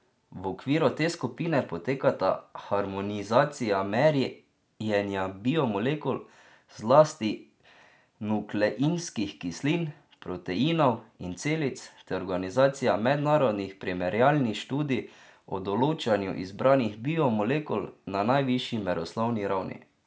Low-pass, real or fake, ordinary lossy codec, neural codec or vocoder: none; real; none; none